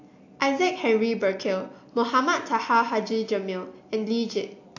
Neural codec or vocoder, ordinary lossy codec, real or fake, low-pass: none; AAC, 48 kbps; real; 7.2 kHz